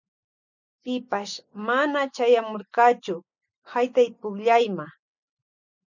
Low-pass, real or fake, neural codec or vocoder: 7.2 kHz; real; none